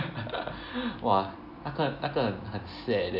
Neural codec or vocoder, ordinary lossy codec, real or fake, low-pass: none; none; real; 5.4 kHz